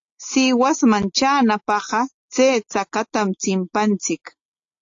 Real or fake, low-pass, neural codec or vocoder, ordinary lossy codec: real; 7.2 kHz; none; AAC, 48 kbps